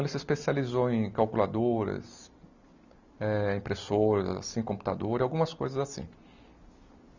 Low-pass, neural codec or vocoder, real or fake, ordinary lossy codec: 7.2 kHz; none; real; none